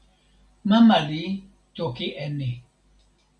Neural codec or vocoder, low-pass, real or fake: none; 9.9 kHz; real